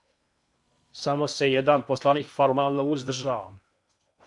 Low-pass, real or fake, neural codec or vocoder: 10.8 kHz; fake; codec, 16 kHz in and 24 kHz out, 0.8 kbps, FocalCodec, streaming, 65536 codes